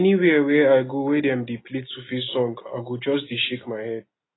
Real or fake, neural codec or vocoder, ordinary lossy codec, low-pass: real; none; AAC, 16 kbps; 7.2 kHz